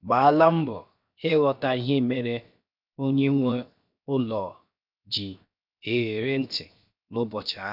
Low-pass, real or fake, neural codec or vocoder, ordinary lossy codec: 5.4 kHz; fake; codec, 16 kHz, 0.7 kbps, FocalCodec; none